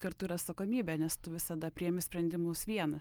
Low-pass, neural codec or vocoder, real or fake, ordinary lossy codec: 19.8 kHz; none; real; Opus, 32 kbps